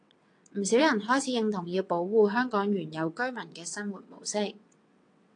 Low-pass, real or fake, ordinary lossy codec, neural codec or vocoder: 9.9 kHz; fake; AAC, 48 kbps; vocoder, 22.05 kHz, 80 mel bands, WaveNeXt